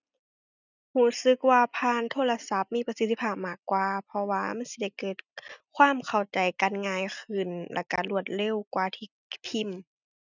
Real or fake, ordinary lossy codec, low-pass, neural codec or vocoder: real; none; 7.2 kHz; none